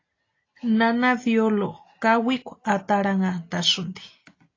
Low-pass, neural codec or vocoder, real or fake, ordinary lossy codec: 7.2 kHz; none; real; AAC, 32 kbps